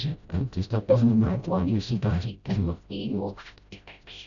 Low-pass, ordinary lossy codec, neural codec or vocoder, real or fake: 7.2 kHz; none; codec, 16 kHz, 0.5 kbps, FreqCodec, smaller model; fake